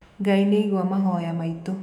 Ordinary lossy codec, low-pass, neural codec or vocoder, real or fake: none; 19.8 kHz; autoencoder, 48 kHz, 128 numbers a frame, DAC-VAE, trained on Japanese speech; fake